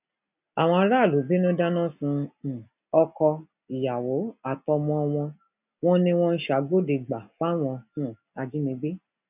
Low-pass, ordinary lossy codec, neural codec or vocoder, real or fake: 3.6 kHz; none; none; real